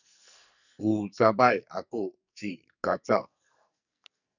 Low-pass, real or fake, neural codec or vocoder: 7.2 kHz; fake; codec, 44.1 kHz, 2.6 kbps, SNAC